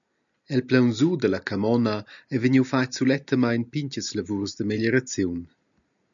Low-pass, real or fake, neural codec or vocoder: 7.2 kHz; real; none